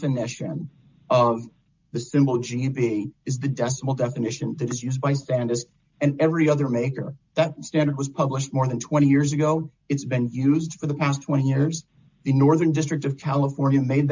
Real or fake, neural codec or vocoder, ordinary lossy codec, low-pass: real; none; MP3, 64 kbps; 7.2 kHz